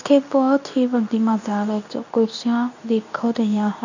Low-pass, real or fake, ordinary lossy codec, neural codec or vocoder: 7.2 kHz; fake; none; codec, 24 kHz, 0.9 kbps, WavTokenizer, medium speech release version 2